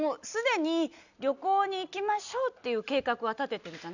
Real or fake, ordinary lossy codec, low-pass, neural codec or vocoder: real; none; 7.2 kHz; none